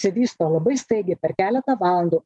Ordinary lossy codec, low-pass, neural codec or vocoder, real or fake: MP3, 96 kbps; 10.8 kHz; none; real